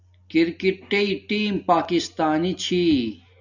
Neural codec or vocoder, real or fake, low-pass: none; real; 7.2 kHz